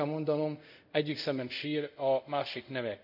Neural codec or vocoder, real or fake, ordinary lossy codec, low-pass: codec, 24 kHz, 0.5 kbps, DualCodec; fake; none; 5.4 kHz